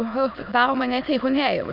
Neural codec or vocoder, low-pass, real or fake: autoencoder, 22.05 kHz, a latent of 192 numbers a frame, VITS, trained on many speakers; 5.4 kHz; fake